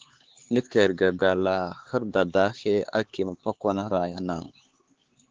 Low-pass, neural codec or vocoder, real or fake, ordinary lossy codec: 7.2 kHz; codec, 16 kHz, 4 kbps, X-Codec, HuBERT features, trained on LibriSpeech; fake; Opus, 16 kbps